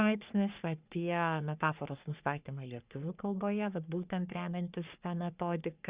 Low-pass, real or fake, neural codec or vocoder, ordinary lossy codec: 3.6 kHz; fake; codec, 44.1 kHz, 3.4 kbps, Pupu-Codec; Opus, 64 kbps